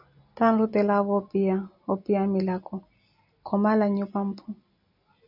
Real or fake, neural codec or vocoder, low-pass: real; none; 5.4 kHz